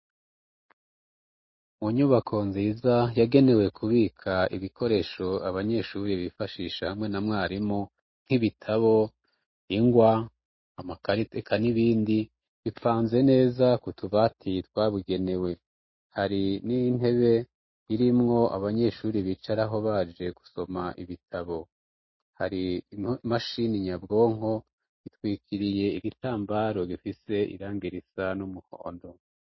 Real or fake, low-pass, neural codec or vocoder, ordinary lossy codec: real; 7.2 kHz; none; MP3, 24 kbps